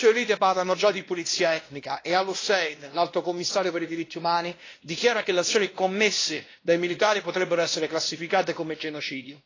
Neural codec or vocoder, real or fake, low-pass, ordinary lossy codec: codec, 16 kHz, 1 kbps, X-Codec, WavLM features, trained on Multilingual LibriSpeech; fake; 7.2 kHz; AAC, 32 kbps